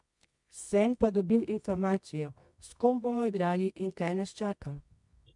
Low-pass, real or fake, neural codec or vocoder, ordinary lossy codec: 10.8 kHz; fake; codec, 24 kHz, 0.9 kbps, WavTokenizer, medium music audio release; MP3, 64 kbps